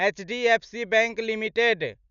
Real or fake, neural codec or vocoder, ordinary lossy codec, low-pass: real; none; none; 7.2 kHz